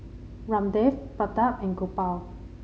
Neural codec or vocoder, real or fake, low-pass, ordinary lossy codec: none; real; none; none